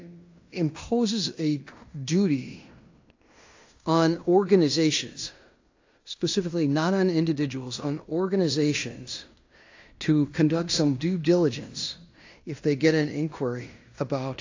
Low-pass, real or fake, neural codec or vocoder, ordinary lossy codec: 7.2 kHz; fake; codec, 16 kHz in and 24 kHz out, 0.9 kbps, LongCat-Audio-Codec, fine tuned four codebook decoder; AAC, 48 kbps